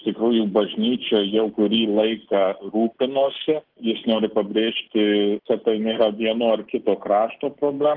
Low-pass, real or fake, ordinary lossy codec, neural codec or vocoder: 5.4 kHz; real; Opus, 16 kbps; none